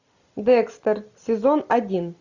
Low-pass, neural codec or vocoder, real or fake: 7.2 kHz; none; real